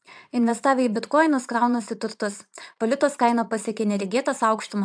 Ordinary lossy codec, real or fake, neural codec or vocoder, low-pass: MP3, 96 kbps; fake; vocoder, 22.05 kHz, 80 mel bands, Vocos; 9.9 kHz